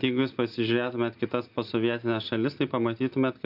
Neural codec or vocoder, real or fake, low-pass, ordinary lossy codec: none; real; 5.4 kHz; AAC, 48 kbps